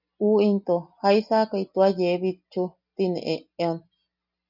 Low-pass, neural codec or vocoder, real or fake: 5.4 kHz; none; real